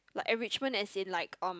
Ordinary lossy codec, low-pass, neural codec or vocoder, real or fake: none; none; none; real